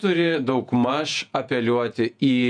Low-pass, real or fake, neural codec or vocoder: 9.9 kHz; fake; vocoder, 48 kHz, 128 mel bands, Vocos